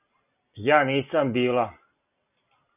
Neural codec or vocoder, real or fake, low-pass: none; real; 3.6 kHz